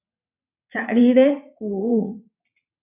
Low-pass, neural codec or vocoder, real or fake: 3.6 kHz; vocoder, 22.05 kHz, 80 mel bands, WaveNeXt; fake